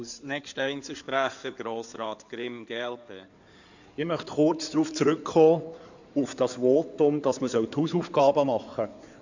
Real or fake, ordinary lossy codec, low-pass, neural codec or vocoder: fake; none; 7.2 kHz; codec, 16 kHz in and 24 kHz out, 2.2 kbps, FireRedTTS-2 codec